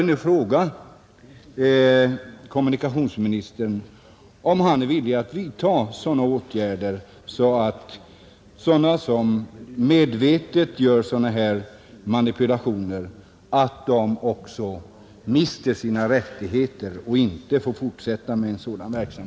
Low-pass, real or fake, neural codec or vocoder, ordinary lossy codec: none; real; none; none